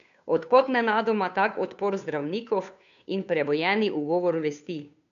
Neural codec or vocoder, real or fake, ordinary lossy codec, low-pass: codec, 16 kHz, 2 kbps, FunCodec, trained on Chinese and English, 25 frames a second; fake; none; 7.2 kHz